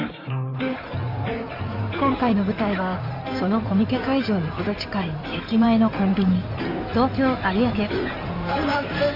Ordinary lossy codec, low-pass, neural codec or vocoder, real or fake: none; 5.4 kHz; codec, 16 kHz in and 24 kHz out, 2.2 kbps, FireRedTTS-2 codec; fake